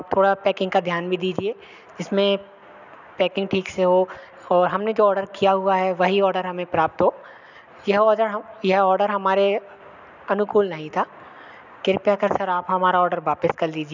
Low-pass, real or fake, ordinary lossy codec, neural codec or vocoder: 7.2 kHz; real; none; none